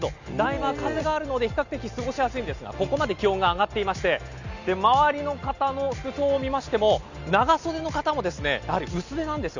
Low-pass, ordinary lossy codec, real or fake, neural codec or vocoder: 7.2 kHz; none; real; none